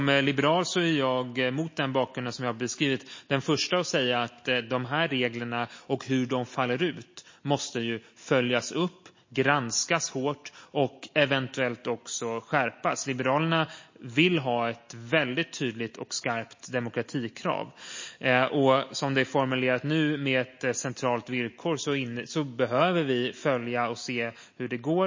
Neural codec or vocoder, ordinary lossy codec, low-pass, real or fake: none; MP3, 32 kbps; 7.2 kHz; real